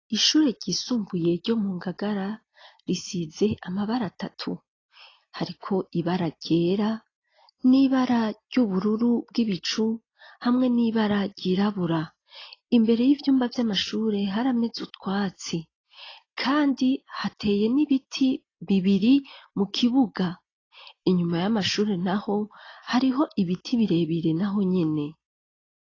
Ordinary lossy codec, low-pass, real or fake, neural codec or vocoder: AAC, 32 kbps; 7.2 kHz; real; none